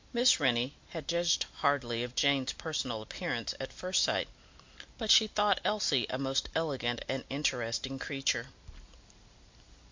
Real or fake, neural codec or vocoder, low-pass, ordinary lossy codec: real; none; 7.2 kHz; MP3, 48 kbps